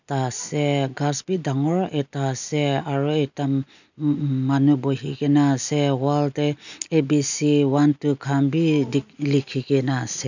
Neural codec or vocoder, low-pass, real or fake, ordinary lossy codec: none; 7.2 kHz; real; none